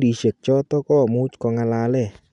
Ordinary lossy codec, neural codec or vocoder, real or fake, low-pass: none; none; real; 10.8 kHz